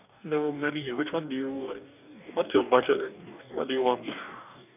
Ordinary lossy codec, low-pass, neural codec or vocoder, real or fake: none; 3.6 kHz; codec, 44.1 kHz, 2.6 kbps, DAC; fake